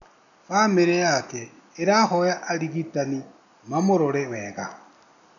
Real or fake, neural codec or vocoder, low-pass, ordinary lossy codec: real; none; 7.2 kHz; none